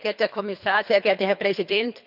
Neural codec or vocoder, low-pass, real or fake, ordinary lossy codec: codec, 24 kHz, 3 kbps, HILCodec; 5.4 kHz; fake; none